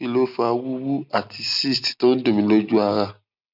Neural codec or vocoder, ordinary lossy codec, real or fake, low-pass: none; none; real; 5.4 kHz